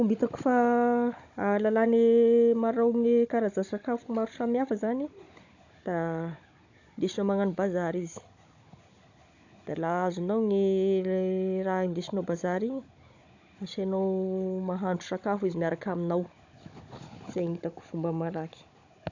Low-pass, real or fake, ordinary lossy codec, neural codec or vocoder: 7.2 kHz; fake; AAC, 48 kbps; codec, 16 kHz, 16 kbps, FunCodec, trained on Chinese and English, 50 frames a second